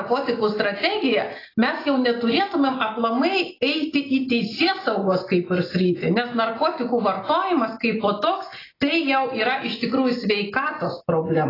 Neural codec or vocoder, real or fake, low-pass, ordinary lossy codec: none; real; 5.4 kHz; AAC, 24 kbps